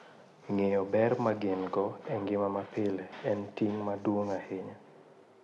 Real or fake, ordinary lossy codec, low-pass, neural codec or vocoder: real; none; none; none